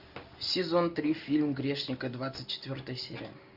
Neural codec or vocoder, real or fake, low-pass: none; real; 5.4 kHz